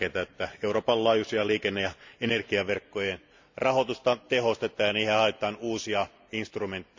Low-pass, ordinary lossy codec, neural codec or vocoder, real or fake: 7.2 kHz; MP3, 64 kbps; none; real